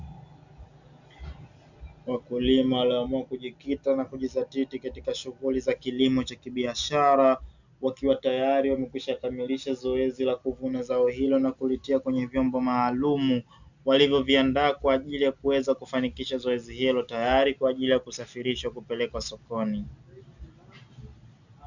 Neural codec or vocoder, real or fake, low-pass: none; real; 7.2 kHz